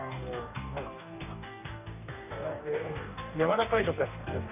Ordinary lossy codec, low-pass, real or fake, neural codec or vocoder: none; 3.6 kHz; fake; codec, 44.1 kHz, 2.6 kbps, DAC